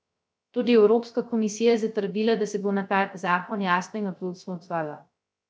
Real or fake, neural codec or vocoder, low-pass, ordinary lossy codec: fake; codec, 16 kHz, 0.3 kbps, FocalCodec; none; none